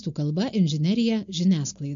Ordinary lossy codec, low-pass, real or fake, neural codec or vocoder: MP3, 48 kbps; 7.2 kHz; real; none